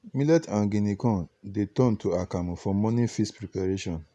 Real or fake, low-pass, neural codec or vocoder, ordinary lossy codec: real; none; none; none